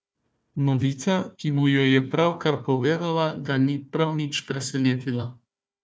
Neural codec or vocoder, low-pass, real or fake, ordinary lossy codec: codec, 16 kHz, 1 kbps, FunCodec, trained on Chinese and English, 50 frames a second; none; fake; none